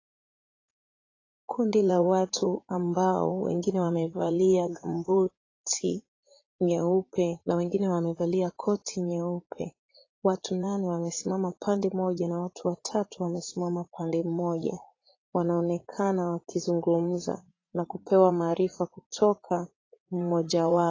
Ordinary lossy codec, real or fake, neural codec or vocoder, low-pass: AAC, 32 kbps; fake; vocoder, 44.1 kHz, 80 mel bands, Vocos; 7.2 kHz